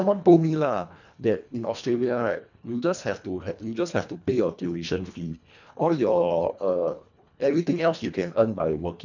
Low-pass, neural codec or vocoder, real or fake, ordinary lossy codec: 7.2 kHz; codec, 24 kHz, 1.5 kbps, HILCodec; fake; none